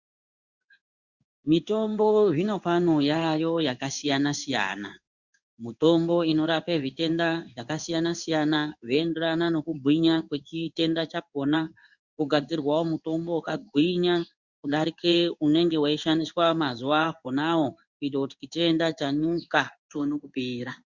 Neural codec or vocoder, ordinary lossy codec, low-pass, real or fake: codec, 16 kHz in and 24 kHz out, 1 kbps, XY-Tokenizer; Opus, 64 kbps; 7.2 kHz; fake